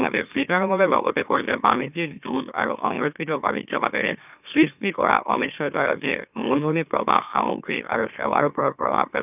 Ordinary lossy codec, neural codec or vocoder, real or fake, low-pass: none; autoencoder, 44.1 kHz, a latent of 192 numbers a frame, MeloTTS; fake; 3.6 kHz